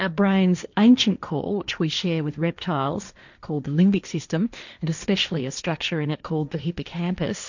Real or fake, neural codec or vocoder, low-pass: fake; codec, 16 kHz, 1.1 kbps, Voila-Tokenizer; 7.2 kHz